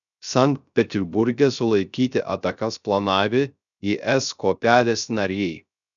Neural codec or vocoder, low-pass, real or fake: codec, 16 kHz, 0.3 kbps, FocalCodec; 7.2 kHz; fake